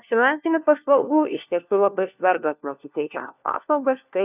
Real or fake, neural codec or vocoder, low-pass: fake; codec, 16 kHz, 1 kbps, FunCodec, trained on LibriTTS, 50 frames a second; 3.6 kHz